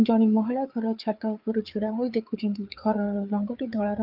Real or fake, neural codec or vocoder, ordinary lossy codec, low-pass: fake; codec, 16 kHz, 4 kbps, FreqCodec, larger model; Opus, 24 kbps; 5.4 kHz